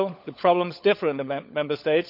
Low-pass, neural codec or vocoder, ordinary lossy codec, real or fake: 5.4 kHz; codec, 16 kHz, 16 kbps, FunCodec, trained on LibriTTS, 50 frames a second; none; fake